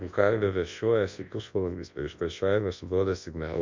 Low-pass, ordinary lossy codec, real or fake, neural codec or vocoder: 7.2 kHz; MP3, 48 kbps; fake; codec, 24 kHz, 0.9 kbps, WavTokenizer, large speech release